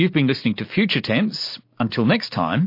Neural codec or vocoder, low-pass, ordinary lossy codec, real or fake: none; 5.4 kHz; MP3, 32 kbps; real